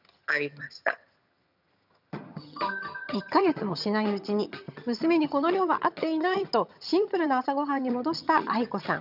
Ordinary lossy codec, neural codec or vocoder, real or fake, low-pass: none; vocoder, 22.05 kHz, 80 mel bands, HiFi-GAN; fake; 5.4 kHz